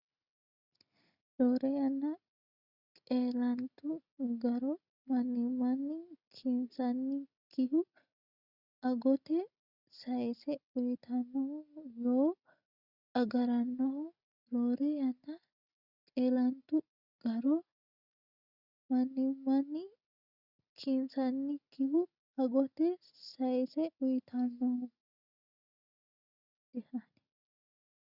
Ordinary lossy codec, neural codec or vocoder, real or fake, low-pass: Opus, 64 kbps; none; real; 5.4 kHz